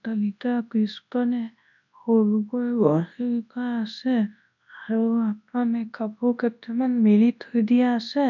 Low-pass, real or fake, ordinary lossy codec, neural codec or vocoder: 7.2 kHz; fake; none; codec, 24 kHz, 0.9 kbps, WavTokenizer, large speech release